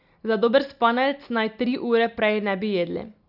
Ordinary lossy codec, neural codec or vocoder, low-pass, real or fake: none; none; 5.4 kHz; real